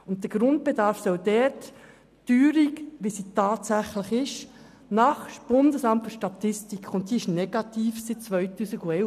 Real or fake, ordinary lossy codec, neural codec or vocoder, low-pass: real; none; none; 14.4 kHz